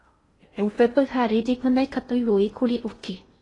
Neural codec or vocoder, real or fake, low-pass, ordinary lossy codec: codec, 16 kHz in and 24 kHz out, 0.6 kbps, FocalCodec, streaming, 2048 codes; fake; 10.8 kHz; AAC, 32 kbps